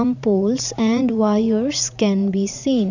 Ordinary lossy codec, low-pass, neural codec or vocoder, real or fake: none; 7.2 kHz; vocoder, 44.1 kHz, 80 mel bands, Vocos; fake